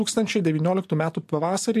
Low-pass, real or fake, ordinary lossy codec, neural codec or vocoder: 14.4 kHz; real; MP3, 64 kbps; none